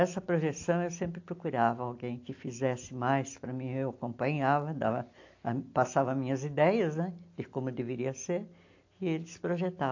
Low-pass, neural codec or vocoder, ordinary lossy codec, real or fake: 7.2 kHz; none; AAC, 48 kbps; real